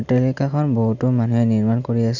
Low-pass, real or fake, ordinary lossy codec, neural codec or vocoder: 7.2 kHz; real; none; none